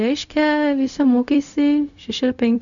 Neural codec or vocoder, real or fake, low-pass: codec, 16 kHz, 0.4 kbps, LongCat-Audio-Codec; fake; 7.2 kHz